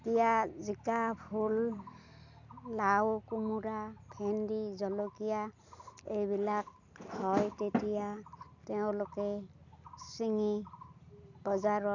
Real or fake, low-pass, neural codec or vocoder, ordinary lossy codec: real; 7.2 kHz; none; none